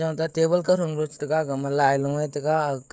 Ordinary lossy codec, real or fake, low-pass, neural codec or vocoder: none; fake; none; codec, 16 kHz, 16 kbps, FreqCodec, smaller model